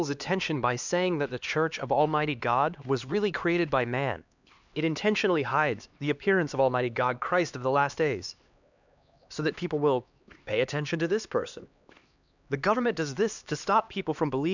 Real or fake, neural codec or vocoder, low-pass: fake; codec, 16 kHz, 2 kbps, X-Codec, HuBERT features, trained on LibriSpeech; 7.2 kHz